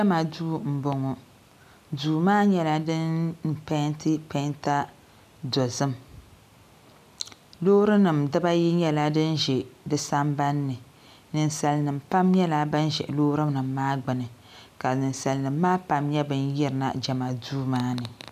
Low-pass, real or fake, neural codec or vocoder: 14.4 kHz; real; none